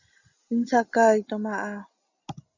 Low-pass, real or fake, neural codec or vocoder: 7.2 kHz; real; none